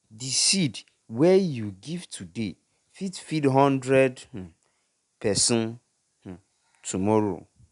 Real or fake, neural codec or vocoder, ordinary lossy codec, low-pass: real; none; none; 10.8 kHz